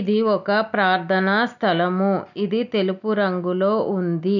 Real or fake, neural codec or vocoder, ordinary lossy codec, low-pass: real; none; none; 7.2 kHz